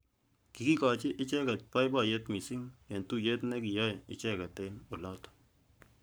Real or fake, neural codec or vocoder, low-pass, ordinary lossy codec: fake; codec, 44.1 kHz, 7.8 kbps, Pupu-Codec; none; none